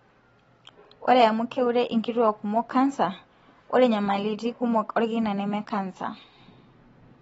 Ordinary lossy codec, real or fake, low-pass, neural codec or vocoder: AAC, 24 kbps; real; 10.8 kHz; none